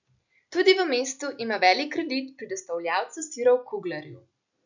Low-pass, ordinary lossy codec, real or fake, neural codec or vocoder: 7.2 kHz; none; real; none